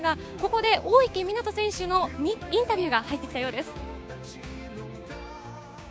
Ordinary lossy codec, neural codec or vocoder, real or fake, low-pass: none; codec, 16 kHz, 6 kbps, DAC; fake; none